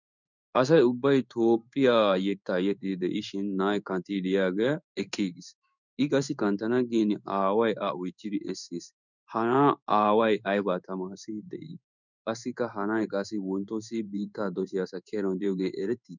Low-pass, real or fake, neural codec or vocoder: 7.2 kHz; fake; codec, 16 kHz in and 24 kHz out, 1 kbps, XY-Tokenizer